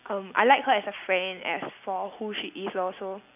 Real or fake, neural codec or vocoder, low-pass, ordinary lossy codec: real; none; 3.6 kHz; none